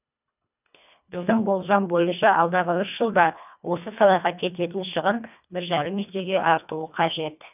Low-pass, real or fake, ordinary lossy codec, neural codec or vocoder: 3.6 kHz; fake; none; codec, 24 kHz, 1.5 kbps, HILCodec